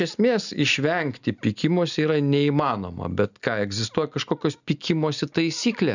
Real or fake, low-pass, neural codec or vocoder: real; 7.2 kHz; none